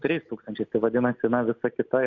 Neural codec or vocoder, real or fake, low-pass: none; real; 7.2 kHz